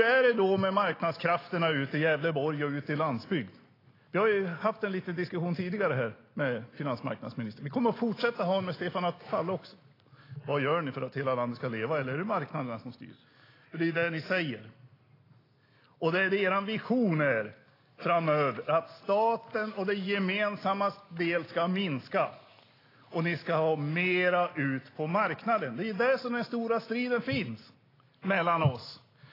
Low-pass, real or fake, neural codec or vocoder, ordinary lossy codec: 5.4 kHz; real; none; AAC, 24 kbps